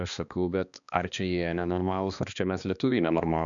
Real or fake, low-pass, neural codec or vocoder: fake; 7.2 kHz; codec, 16 kHz, 2 kbps, X-Codec, HuBERT features, trained on balanced general audio